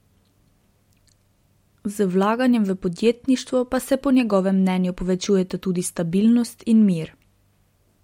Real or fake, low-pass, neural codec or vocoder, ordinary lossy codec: real; 19.8 kHz; none; MP3, 64 kbps